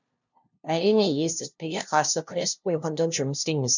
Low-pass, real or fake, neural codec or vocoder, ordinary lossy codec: 7.2 kHz; fake; codec, 16 kHz, 0.5 kbps, FunCodec, trained on LibriTTS, 25 frames a second; none